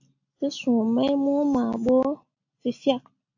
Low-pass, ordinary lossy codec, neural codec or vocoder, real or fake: 7.2 kHz; AAC, 48 kbps; none; real